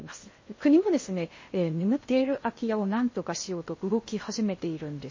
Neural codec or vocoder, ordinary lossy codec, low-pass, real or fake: codec, 16 kHz in and 24 kHz out, 0.6 kbps, FocalCodec, streaming, 2048 codes; MP3, 32 kbps; 7.2 kHz; fake